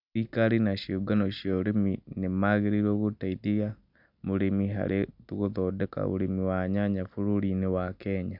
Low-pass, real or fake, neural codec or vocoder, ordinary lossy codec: 5.4 kHz; real; none; none